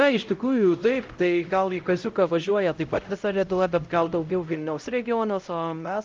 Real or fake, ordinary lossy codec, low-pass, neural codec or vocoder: fake; Opus, 24 kbps; 7.2 kHz; codec, 16 kHz, 0.5 kbps, X-Codec, HuBERT features, trained on LibriSpeech